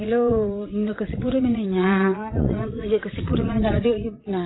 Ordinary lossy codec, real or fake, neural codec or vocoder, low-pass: AAC, 16 kbps; fake; vocoder, 22.05 kHz, 80 mel bands, Vocos; 7.2 kHz